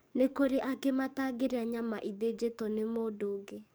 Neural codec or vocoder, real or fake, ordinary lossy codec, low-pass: codec, 44.1 kHz, 7.8 kbps, DAC; fake; none; none